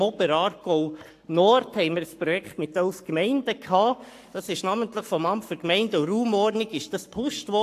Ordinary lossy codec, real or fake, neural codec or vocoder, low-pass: AAC, 64 kbps; fake; codec, 44.1 kHz, 7.8 kbps, Pupu-Codec; 14.4 kHz